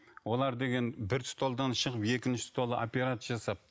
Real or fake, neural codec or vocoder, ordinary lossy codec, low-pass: real; none; none; none